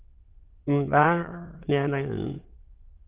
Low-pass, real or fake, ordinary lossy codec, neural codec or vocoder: 3.6 kHz; fake; Opus, 16 kbps; autoencoder, 22.05 kHz, a latent of 192 numbers a frame, VITS, trained on many speakers